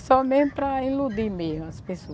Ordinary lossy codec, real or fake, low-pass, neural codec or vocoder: none; real; none; none